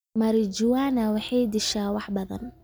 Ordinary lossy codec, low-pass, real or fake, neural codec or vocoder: none; none; real; none